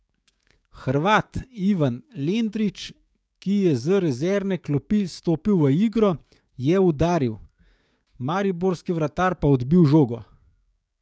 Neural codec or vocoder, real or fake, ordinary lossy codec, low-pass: codec, 16 kHz, 6 kbps, DAC; fake; none; none